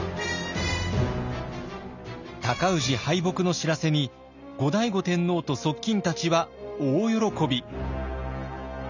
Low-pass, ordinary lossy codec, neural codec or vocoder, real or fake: 7.2 kHz; none; none; real